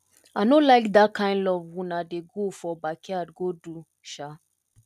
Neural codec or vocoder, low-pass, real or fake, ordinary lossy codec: none; 14.4 kHz; real; none